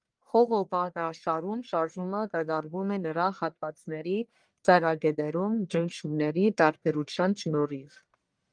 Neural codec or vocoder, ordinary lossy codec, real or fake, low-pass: codec, 44.1 kHz, 1.7 kbps, Pupu-Codec; Opus, 32 kbps; fake; 9.9 kHz